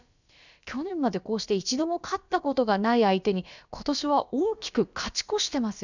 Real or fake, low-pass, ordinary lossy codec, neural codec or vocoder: fake; 7.2 kHz; none; codec, 16 kHz, about 1 kbps, DyCAST, with the encoder's durations